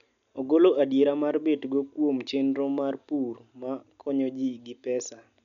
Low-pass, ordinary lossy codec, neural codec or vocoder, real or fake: 7.2 kHz; none; none; real